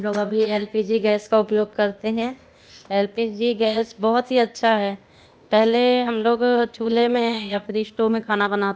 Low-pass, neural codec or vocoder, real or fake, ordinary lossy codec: none; codec, 16 kHz, 0.8 kbps, ZipCodec; fake; none